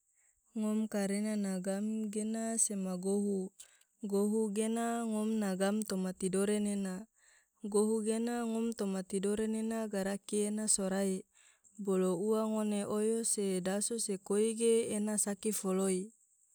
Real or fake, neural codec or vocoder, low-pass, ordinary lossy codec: real; none; none; none